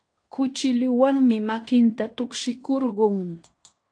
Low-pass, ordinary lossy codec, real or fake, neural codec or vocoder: 9.9 kHz; AAC, 48 kbps; fake; codec, 16 kHz in and 24 kHz out, 0.9 kbps, LongCat-Audio-Codec, fine tuned four codebook decoder